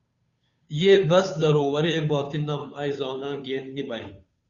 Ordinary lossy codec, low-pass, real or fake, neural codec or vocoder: Opus, 64 kbps; 7.2 kHz; fake; codec, 16 kHz, 2 kbps, FunCodec, trained on Chinese and English, 25 frames a second